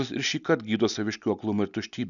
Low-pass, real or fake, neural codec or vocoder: 7.2 kHz; real; none